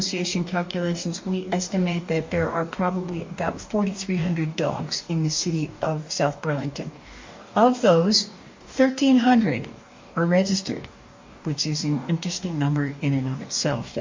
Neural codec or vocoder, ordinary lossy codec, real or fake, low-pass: codec, 44.1 kHz, 2.6 kbps, DAC; MP3, 48 kbps; fake; 7.2 kHz